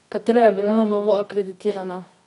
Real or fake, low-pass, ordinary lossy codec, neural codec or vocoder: fake; 10.8 kHz; none; codec, 24 kHz, 0.9 kbps, WavTokenizer, medium music audio release